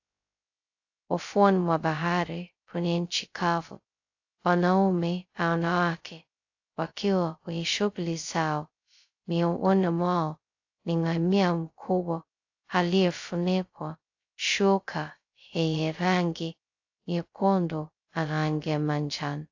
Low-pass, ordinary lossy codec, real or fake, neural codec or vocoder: 7.2 kHz; AAC, 48 kbps; fake; codec, 16 kHz, 0.2 kbps, FocalCodec